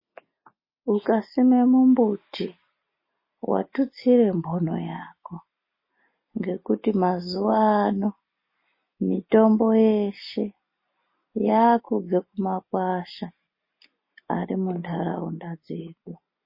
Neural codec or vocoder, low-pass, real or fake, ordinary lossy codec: none; 5.4 kHz; real; MP3, 24 kbps